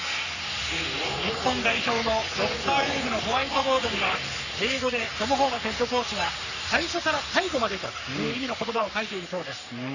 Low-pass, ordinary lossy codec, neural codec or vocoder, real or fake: 7.2 kHz; none; codec, 44.1 kHz, 3.4 kbps, Pupu-Codec; fake